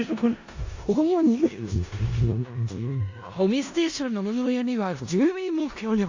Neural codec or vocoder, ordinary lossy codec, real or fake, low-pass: codec, 16 kHz in and 24 kHz out, 0.4 kbps, LongCat-Audio-Codec, four codebook decoder; AAC, 48 kbps; fake; 7.2 kHz